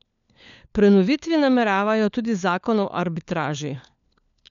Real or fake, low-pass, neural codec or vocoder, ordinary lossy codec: fake; 7.2 kHz; codec, 16 kHz, 4 kbps, FunCodec, trained on LibriTTS, 50 frames a second; none